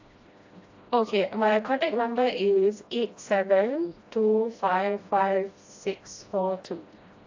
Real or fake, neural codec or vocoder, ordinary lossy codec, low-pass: fake; codec, 16 kHz, 1 kbps, FreqCodec, smaller model; AAC, 48 kbps; 7.2 kHz